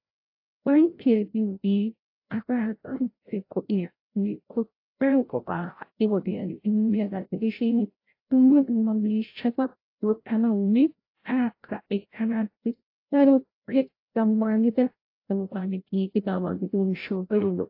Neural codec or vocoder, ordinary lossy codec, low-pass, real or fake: codec, 16 kHz, 0.5 kbps, FreqCodec, larger model; AAC, 32 kbps; 5.4 kHz; fake